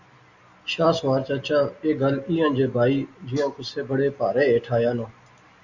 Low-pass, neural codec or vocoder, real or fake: 7.2 kHz; none; real